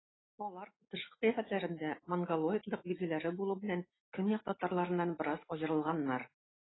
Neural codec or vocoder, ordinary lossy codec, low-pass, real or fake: none; AAC, 16 kbps; 7.2 kHz; real